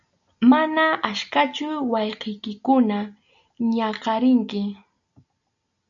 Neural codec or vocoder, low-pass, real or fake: none; 7.2 kHz; real